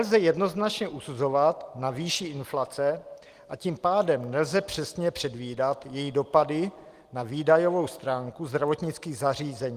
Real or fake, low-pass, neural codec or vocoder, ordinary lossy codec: real; 14.4 kHz; none; Opus, 24 kbps